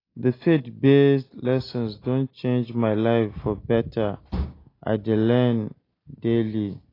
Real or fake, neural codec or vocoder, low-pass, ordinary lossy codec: real; none; 5.4 kHz; AAC, 24 kbps